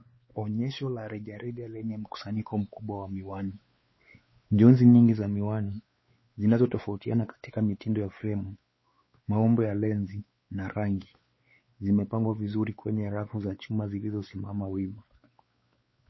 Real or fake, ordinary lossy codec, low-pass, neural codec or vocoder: fake; MP3, 24 kbps; 7.2 kHz; codec, 16 kHz, 4 kbps, X-Codec, WavLM features, trained on Multilingual LibriSpeech